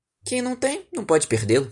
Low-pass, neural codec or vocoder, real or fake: 10.8 kHz; none; real